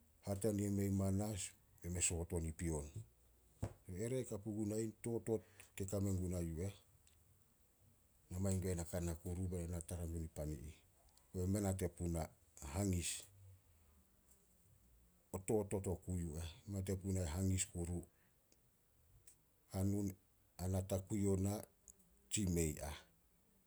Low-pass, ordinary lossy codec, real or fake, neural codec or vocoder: none; none; real; none